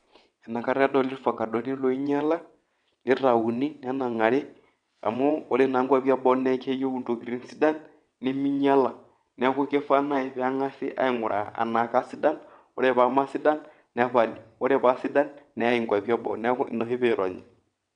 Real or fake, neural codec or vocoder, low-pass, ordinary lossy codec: fake; vocoder, 22.05 kHz, 80 mel bands, WaveNeXt; 9.9 kHz; none